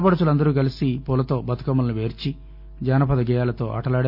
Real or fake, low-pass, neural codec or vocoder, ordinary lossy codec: real; 5.4 kHz; none; none